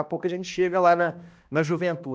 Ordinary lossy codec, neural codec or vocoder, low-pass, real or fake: none; codec, 16 kHz, 1 kbps, X-Codec, HuBERT features, trained on balanced general audio; none; fake